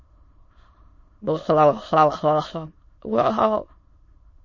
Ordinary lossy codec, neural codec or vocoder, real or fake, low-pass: MP3, 32 kbps; autoencoder, 22.05 kHz, a latent of 192 numbers a frame, VITS, trained on many speakers; fake; 7.2 kHz